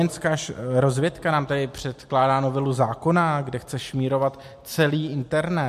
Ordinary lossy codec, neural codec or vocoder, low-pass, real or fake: MP3, 64 kbps; none; 14.4 kHz; real